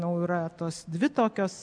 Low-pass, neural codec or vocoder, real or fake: 9.9 kHz; none; real